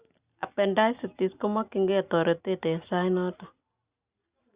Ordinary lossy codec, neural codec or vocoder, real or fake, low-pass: Opus, 64 kbps; none; real; 3.6 kHz